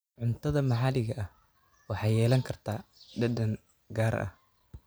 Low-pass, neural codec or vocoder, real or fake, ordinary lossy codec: none; none; real; none